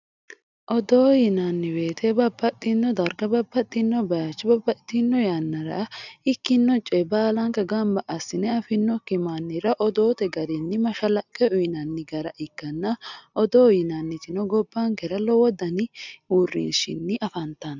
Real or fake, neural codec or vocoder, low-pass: real; none; 7.2 kHz